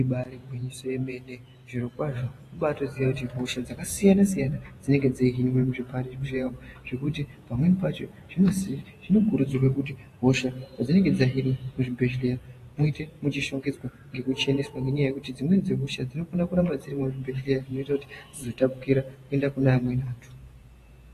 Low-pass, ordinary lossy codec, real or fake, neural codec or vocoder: 14.4 kHz; AAC, 48 kbps; fake; vocoder, 48 kHz, 128 mel bands, Vocos